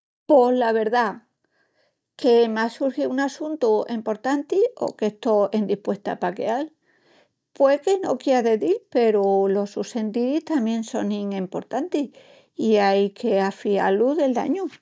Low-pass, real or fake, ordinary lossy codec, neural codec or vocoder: none; real; none; none